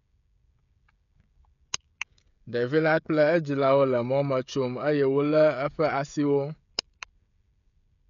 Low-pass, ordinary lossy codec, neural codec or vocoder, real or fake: 7.2 kHz; none; codec, 16 kHz, 16 kbps, FreqCodec, smaller model; fake